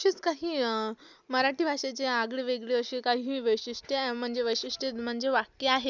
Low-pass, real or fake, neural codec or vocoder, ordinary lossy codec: 7.2 kHz; real; none; none